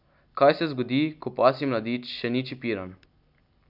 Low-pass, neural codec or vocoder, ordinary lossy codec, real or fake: 5.4 kHz; none; none; real